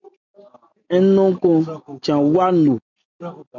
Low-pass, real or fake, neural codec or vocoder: 7.2 kHz; real; none